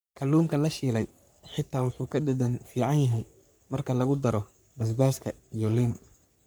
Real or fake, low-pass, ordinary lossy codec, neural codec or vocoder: fake; none; none; codec, 44.1 kHz, 3.4 kbps, Pupu-Codec